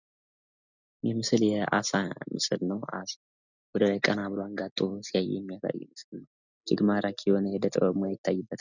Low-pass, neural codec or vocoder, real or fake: 7.2 kHz; none; real